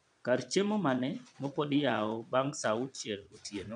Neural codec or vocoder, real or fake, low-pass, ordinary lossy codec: vocoder, 22.05 kHz, 80 mel bands, Vocos; fake; 9.9 kHz; none